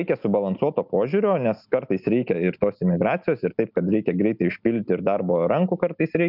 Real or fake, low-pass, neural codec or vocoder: real; 5.4 kHz; none